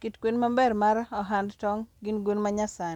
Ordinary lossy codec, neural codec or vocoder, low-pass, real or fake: none; none; 19.8 kHz; real